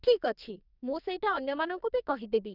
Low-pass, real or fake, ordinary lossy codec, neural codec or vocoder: 5.4 kHz; fake; none; codec, 44.1 kHz, 2.6 kbps, SNAC